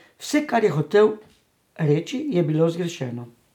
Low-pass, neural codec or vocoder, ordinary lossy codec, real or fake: 19.8 kHz; none; none; real